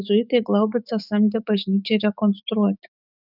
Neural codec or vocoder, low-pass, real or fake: autoencoder, 48 kHz, 128 numbers a frame, DAC-VAE, trained on Japanese speech; 5.4 kHz; fake